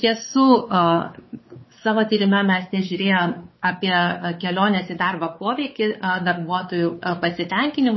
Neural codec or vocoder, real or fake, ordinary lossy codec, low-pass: codec, 16 kHz, 4 kbps, X-Codec, WavLM features, trained on Multilingual LibriSpeech; fake; MP3, 24 kbps; 7.2 kHz